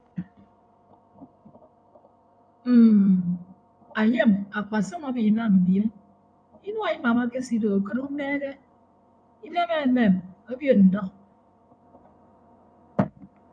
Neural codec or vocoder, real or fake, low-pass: codec, 16 kHz in and 24 kHz out, 2.2 kbps, FireRedTTS-2 codec; fake; 9.9 kHz